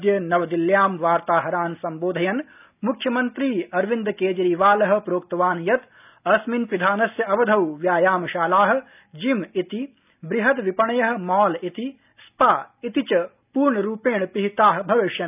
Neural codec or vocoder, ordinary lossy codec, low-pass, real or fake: none; none; 3.6 kHz; real